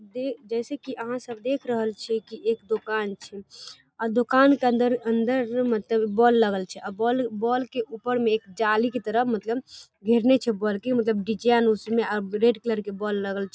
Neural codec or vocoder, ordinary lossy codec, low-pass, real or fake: none; none; none; real